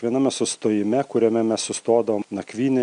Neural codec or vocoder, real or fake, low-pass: none; real; 9.9 kHz